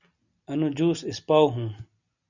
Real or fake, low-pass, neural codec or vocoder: real; 7.2 kHz; none